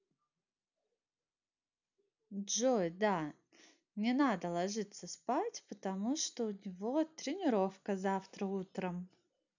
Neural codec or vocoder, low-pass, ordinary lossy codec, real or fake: none; 7.2 kHz; none; real